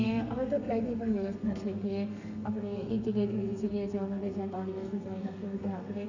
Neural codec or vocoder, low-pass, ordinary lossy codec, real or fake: codec, 32 kHz, 1.9 kbps, SNAC; 7.2 kHz; none; fake